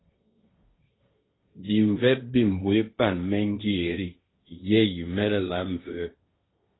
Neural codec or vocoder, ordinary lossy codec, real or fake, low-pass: codec, 16 kHz, 1.1 kbps, Voila-Tokenizer; AAC, 16 kbps; fake; 7.2 kHz